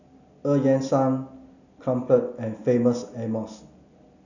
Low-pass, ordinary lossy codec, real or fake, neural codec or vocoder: 7.2 kHz; none; real; none